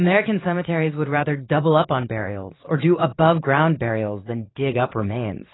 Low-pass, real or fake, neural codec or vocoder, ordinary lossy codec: 7.2 kHz; fake; vocoder, 44.1 kHz, 80 mel bands, Vocos; AAC, 16 kbps